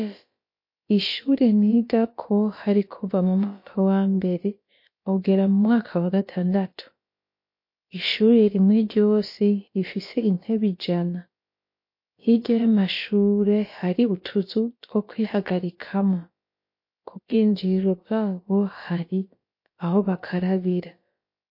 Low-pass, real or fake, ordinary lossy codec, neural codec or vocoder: 5.4 kHz; fake; MP3, 32 kbps; codec, 16 kHz, about 1 kbps, DyCAST, with the encoder's durations